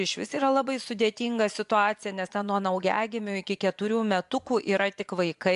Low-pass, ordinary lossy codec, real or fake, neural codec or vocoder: 10.8 kHz; MP3, 96 kbps; fake; vocoder, 24 kHz, 100 mel bands, Vocos